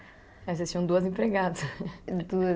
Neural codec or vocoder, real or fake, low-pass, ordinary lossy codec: none; real; none; none